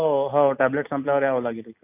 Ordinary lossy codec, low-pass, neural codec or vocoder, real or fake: none; 3.6 kHz; none; real